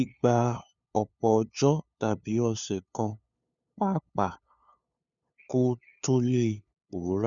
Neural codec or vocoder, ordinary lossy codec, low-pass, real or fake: codec, 16 kHz, 2 kbps, FunCodec, trained on LibriTTS, 25 frames a second; none; 7.2 kHz; fake